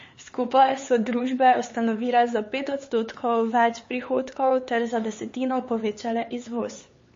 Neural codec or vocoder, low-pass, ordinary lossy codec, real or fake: codec, 16 kHz, 4 kbps, X-Codec, HuBERT features, trained on LibriSpeech; 7.2 kHz; MP3, 32 kbps; fake